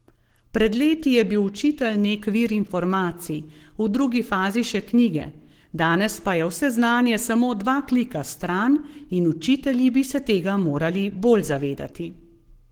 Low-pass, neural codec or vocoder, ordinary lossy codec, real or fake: 19.8 kHz; codec, 44.1 kHz, 7.8 kbps, Pupu-Codec; Opus, 16 kbps; fake